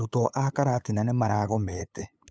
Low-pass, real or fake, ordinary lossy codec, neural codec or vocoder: none; fake; none; codec, 16 kHz, 8 kbps, FunCodec, trained on LibriTTS, 25 frames a second